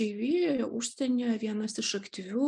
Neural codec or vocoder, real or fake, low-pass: none; real; 10.8 kHz